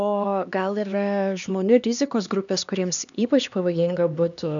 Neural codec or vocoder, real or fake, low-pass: codec, 16 kHz, 2 kbps, X-Codec, HuBERT features, trained on LibriSpeech; fake; 7.2 kHz